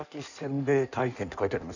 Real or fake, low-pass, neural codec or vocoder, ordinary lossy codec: fake; 7.2 kHz; codec, 16 kHz in and 24 kHz out, 1.1 kbps, FireRedTTS-2 codec; none